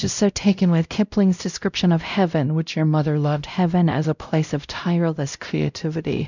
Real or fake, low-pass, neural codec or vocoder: fake; 7.2 kHz; codec, 16 kHz, 0.5 kbps, X-Codec, WavLM features, trained on Multilingual LibriSpeech